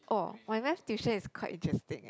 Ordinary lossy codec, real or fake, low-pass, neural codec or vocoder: none; real; none; none